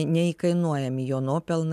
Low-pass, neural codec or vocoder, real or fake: 14.4 kHz; none; real